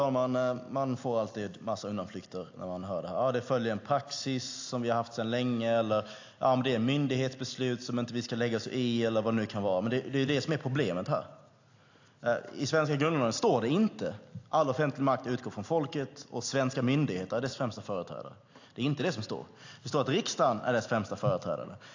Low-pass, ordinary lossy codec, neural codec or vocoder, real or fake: 7.2 kHz; AAC, 48 kbps; none; real